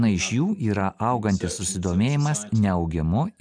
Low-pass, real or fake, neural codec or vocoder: 9.9 kHz; real; none